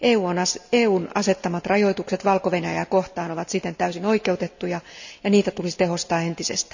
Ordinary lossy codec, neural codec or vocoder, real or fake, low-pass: none; none; real; 7.2 kHz